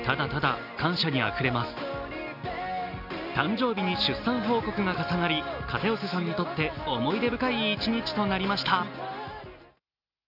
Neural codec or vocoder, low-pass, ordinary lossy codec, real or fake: none; 5.4 kHz; none; real